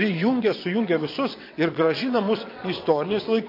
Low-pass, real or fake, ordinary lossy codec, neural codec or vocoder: 5.4 kHz; fake; MP3, 32 kbps; vocoder, 22.05 kHz, 80 mel bands, WaveNeXt